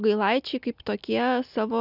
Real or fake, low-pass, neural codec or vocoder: real; 5.4 kHz; none